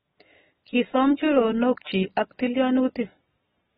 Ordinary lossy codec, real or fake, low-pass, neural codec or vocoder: AAC, 16 kbps; real; 19.8 kHz; none